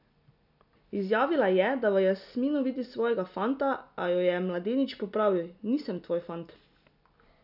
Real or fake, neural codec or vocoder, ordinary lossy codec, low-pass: real; none; none; 5.4 kHz